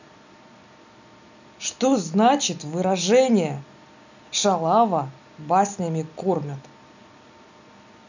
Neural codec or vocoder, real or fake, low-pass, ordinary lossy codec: none; real; 7.2 kHz; none